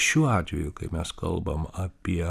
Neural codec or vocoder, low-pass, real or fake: vocoder, 44.1 kHz, 128 mel bands every 256 samples, BigVGAN v2; 14.4 kHz; fake